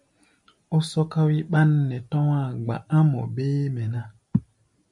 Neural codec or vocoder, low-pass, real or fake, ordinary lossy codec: none; 10.8 kHz; real; MP3, 64 kbps